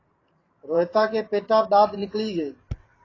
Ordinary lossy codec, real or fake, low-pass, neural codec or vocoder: AAC, 32 kbps; fake; 7.2 kHz; vocoder, 44.1 kHz, 128 mel bands every 512 samples, BigVGAN v2